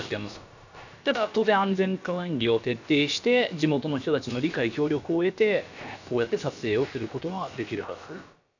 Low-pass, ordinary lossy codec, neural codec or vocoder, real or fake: 7.2 kHz; none; codec, 16 kHz, about 1 kbps, DyCAST, with the encoder's durations; fake